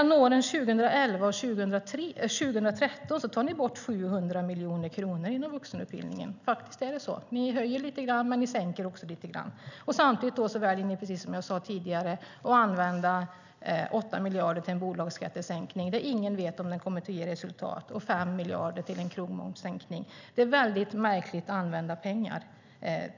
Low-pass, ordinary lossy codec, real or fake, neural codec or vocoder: 7.2 kHz; none; real; none